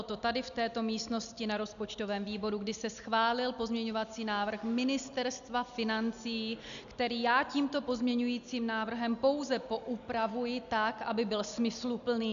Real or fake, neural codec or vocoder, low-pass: real; none; 7.2 kHz